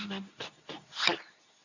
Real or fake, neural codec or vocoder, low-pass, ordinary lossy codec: fake; codec, 24 kHz, 0.9 kbps, WavTokenizer, medium speech release version 2; 7.2 kHz; none